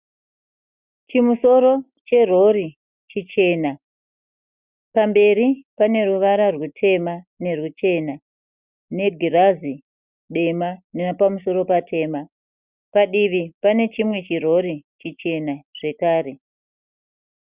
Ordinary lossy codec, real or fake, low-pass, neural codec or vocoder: Opus, 64 kbps; real; 3.6 kHz; none